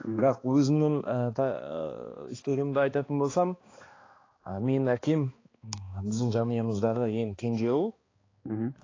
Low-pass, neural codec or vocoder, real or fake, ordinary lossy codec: 7.2 kHz; codec, 16 kHz, 2 kbps, X-Codec, HuBERT features, trained on balanced general audio; fake; AAC, 32 kbps